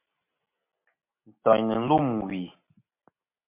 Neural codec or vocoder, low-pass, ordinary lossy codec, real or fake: none; 3.6 kHz; MP3, 32 kbps; real